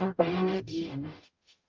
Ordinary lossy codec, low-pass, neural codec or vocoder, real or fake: Opus, 32 kbps; 7.2 kHz; codec, 44.1 kHz, 0.9 kbps, DAC; fake